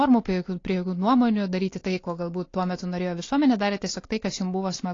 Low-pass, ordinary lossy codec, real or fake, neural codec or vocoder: 7.2 kHz; AAC, 32 kbps; real; none